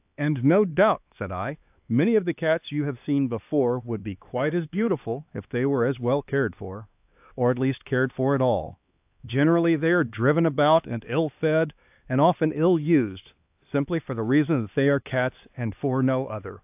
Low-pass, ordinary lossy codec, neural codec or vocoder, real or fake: 3.6 kHz; AAC, 32 kbps; codec, 16 kHz, 2 kbps, X-Codec, HuBERT features, trained on LibriSpeech; fake